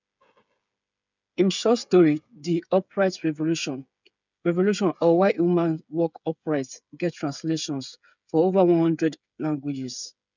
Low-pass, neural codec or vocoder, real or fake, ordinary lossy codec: 7.2 kHz; codec, 16 kHz, 8 kbps, FreqCodec, smaller model; fake; none